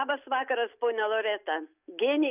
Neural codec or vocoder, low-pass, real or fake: none; 3.6 kHz; real